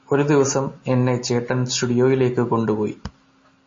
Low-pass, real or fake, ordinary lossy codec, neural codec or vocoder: 7.2 kHz; real; MP3, 32 kbps; none